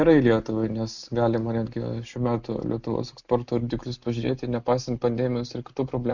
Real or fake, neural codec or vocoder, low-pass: real; none; 7.2 kHz